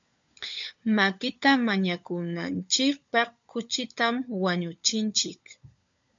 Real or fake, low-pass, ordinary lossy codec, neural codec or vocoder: fake; 7.2 kHz; AAC, 64 kbps; codec, 16 kHz, 16 kbps, FunCodec, trained on LibriTTS, 50 frames a second